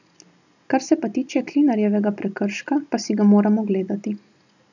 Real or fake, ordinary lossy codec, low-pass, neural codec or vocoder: real; none; 7.2 kHz; none